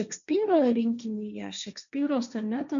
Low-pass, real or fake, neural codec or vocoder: 7.2 kHz; fake; codec, 16 kHz, 1.1 kbps, Voila-Tokenizer